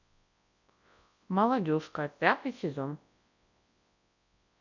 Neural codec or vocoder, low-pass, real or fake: codec, 24 kHz, 0.9 kbps, WavTokenizer, large speech release; 7.2 kHz; fake